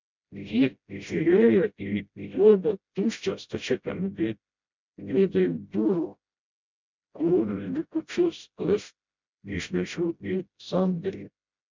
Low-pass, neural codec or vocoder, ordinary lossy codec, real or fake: 7.2 kHz; codec, 16 kHz, 0.5 kbps, FreqCodec, smaller model; MP3, 64 kbps; fake